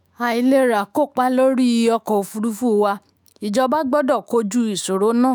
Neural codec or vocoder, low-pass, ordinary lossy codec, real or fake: autoencoder, 48 kHz, 128 numbers a frame, DAC-VAE, trained on Japanese speech; none; none; fake